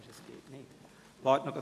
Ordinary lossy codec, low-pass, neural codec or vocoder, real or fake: AAC, 64 kbps; 14.4 kHz; none; real